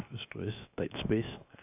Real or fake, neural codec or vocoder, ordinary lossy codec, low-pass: fake; codec, 16 kHz, 0.7 kbps, FocalCodec; AAC, 32 kbps; 3.6 kHz